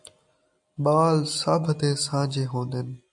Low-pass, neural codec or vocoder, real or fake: 10.8 kHz; none; real